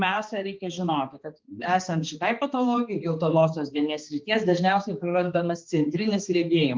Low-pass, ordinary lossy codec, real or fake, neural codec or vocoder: 7.2 kHz; Opus, 32 kbps; fake; codec, 16 kHz, 2 kbps, X-Codec, HuBERT features, trained on balanced general audio